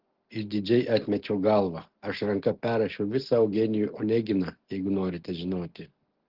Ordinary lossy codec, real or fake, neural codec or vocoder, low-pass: Opus, 16 kbps; real; none; 5.4 kHz